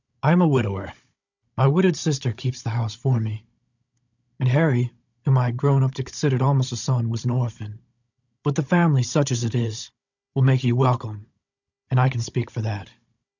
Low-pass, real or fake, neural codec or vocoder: 7.2 kHz; fake; codec, 16 kHz, 16 kbps, FunCodec, trained on Chinese and English, 50 frames a second